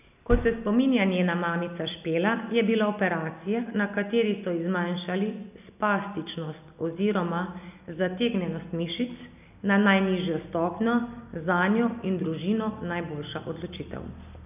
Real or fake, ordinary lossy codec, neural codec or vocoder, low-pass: real; none; none; 3.6 kHz